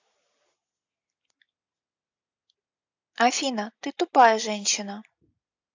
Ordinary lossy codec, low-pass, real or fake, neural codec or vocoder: AAC, 48 kbps; 7.2 kHz; real; none